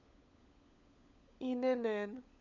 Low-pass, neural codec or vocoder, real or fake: 7.2 kHz; codec, 16 kHz, 16 kbps, FunCodec, trained on LibriTTS, 50 frames a second; fake